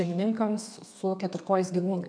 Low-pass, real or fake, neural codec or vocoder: 9.9 kHz; fake; codec, 32 kHz, 1.9 kbps, SNAC